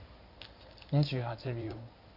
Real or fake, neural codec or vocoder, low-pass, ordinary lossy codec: fake; codec, 16 kHz in and 24 kHz out, 2.2 kbps, FireRedTTS-2 codec; 5.4 kHz; none